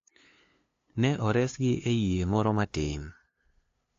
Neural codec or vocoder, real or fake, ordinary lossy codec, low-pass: codec, 16 kHz, 2 kbps, FunCodec, trained on LibriTTS, 25 frames a second; fake; AAC, 48 kbps; 7.2 kHz